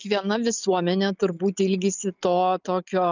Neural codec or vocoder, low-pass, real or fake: none; 7.2 kHz; real